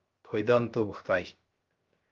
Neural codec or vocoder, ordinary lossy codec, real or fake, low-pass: codec, 16 kHz, 0.3 kbps, FocalCodec; Opus, 32 kbps; fake; 7.2 kHz